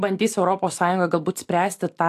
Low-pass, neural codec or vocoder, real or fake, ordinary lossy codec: 14.4 kHz; none; real; AAC, 64 kbps